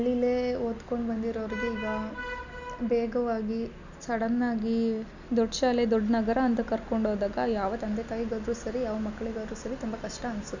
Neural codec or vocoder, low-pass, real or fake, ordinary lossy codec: none; 7.2 kHz; real; none